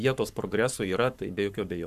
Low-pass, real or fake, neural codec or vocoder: 14.4 kHz; fake; codec, 44.1 kHz, 7.8 kbps, Pupu-Codec